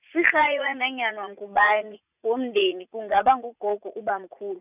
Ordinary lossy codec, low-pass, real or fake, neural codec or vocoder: none; 3.6 kHz; fake; vocoder, 44.1 kHz, 80 mel bands, Vocos